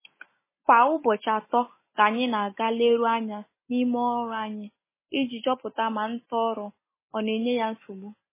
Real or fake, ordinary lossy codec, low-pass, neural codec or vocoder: real; MP3, 16 kbps; 3.6 kHz; none